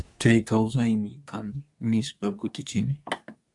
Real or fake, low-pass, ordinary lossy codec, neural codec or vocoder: fake; 10.8 kHz; AAC, 64 kbps; codec, 24 kHz, 1 kbps, SNAC